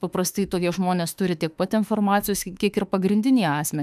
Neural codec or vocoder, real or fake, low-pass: autoencoder, 48 kHz, 32 numbers a frame, DAC-VAE, trained on Japanese speech; fake; 14.4 kHz